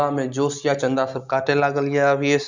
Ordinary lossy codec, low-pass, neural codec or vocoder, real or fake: Opus, 64 kbps; 7.2 kHz; none; real